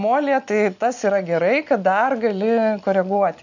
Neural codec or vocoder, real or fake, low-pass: none; real; 7.2 kHz